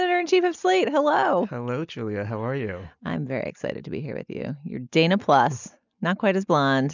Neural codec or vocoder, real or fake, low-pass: none; real; 7.2 kHz